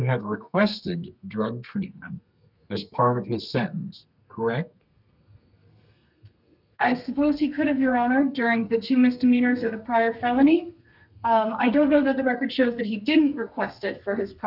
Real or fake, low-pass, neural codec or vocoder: fake; 5.4 kHz; codec, 32 kHz, 1.9 kbps, SNAC